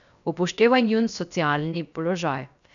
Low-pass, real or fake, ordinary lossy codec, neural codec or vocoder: 7.2 kHz; fake; none; codec, 16 kHz, 0.7 kbps, FocalCodec